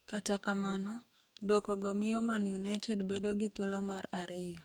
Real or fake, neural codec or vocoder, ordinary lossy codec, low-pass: fake; codec, 44.1 kHz, 2.6 kbps, DAC; none; none